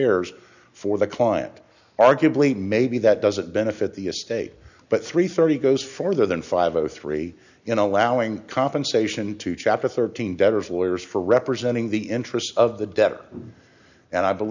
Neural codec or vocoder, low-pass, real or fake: none; 7.2 kHz; real